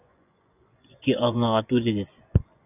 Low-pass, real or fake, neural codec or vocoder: 3.6 kHz; fake; vocoder, 24 kHz, 100 mel bands, Vocos